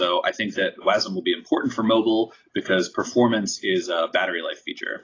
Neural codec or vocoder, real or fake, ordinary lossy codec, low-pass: none; real; AAC, 32 kbps; 7.2 kHz